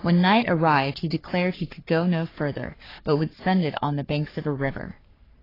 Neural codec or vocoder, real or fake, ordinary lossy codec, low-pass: codec, 44.1 kHz, 3.4 kbps, Pupu-Codec; fake; AAC, 24 kbps; 5.4 kHz